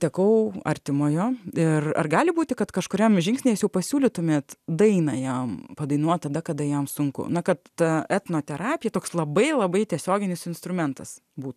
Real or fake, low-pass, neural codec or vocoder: real; 14.4 kHz; none